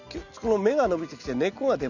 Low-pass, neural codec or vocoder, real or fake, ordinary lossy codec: 7.2 kHz; none; real; none